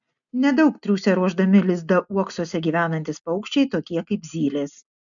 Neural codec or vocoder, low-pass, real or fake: none; 7.2 kHz; real